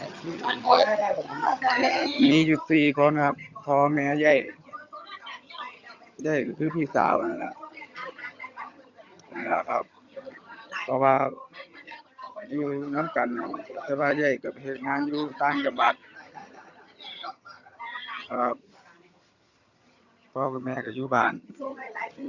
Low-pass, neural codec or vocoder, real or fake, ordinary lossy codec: 7.2 kHz; vocoder, 22.05 kHz, 80 mel bands, HiFi-GAN; fake; Opus, 64 kbps